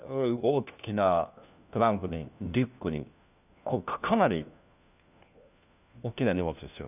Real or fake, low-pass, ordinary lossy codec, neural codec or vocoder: fake; 3.6 kHz; none; codec, 16 kHz, 1 kbps, FunCodec, trained on LibriTTS, 50 frames a second